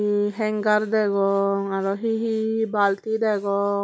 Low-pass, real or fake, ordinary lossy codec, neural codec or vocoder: none; real; none; none